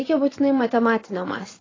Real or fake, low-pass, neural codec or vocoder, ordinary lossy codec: fake; 7.2 kHz; vocoder, 24 kHz, 100 mel bands, Vocos; AAC, 32 kbps